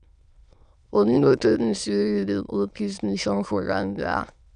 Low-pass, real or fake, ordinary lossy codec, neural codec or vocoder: 9.9 kHz; fake; none; autoencoder, 22.05 kHz, a latent of 192 numbers a frame, VITS, trained on many speakers